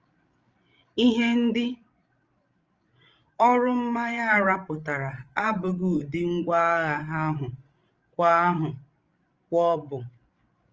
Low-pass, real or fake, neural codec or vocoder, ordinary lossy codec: 7.2 kHz; fake; codec, 16 kHz, 16 kbps, FreqCodec, larger model; Opus, 24 kbps